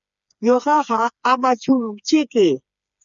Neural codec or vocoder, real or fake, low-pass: codec, 16 kHz, 4 kbps, FreqCodec, smaller model; fake; 7.2 kHz